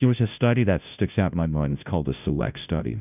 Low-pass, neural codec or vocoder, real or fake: 3.6 kHz; codec, 16 kHz, 0.5 kbps, FunCodec, trained on Chinese and English, 25 frames a second; fake